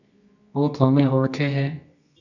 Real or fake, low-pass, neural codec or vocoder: fake; 7.2 kHz; codec, 24 kHz, 0.9 kbps, WavTokenizer, medium music audio release